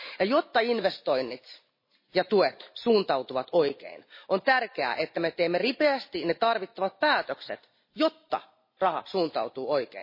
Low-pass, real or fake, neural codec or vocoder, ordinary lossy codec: 5.4 kHz; real; none; MP3, 24 kbps